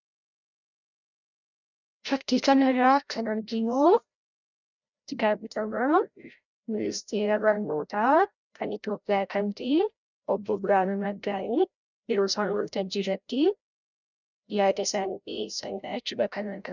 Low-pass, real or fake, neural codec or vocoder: 7.2 kHz; fake; codec, 16 kHz, 0.5 kbps, FreqCodec, larger model